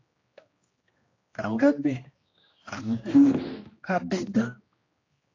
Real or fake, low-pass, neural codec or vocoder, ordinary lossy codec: fake; 7.2 kHz; codec, 16 kHz, 1 kbps, X-Codec, HuBERT features, trained on general audio; AAC, 32 kbps